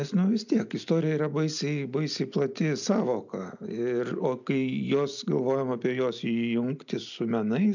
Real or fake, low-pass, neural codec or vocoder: real; 7.2 kHz; none